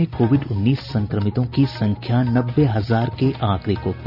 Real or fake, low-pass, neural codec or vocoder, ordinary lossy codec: real; 5.4 kHz; none; none